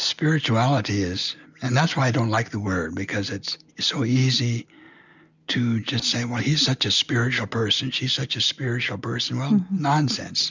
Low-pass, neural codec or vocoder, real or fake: 7.2 kHz; none; real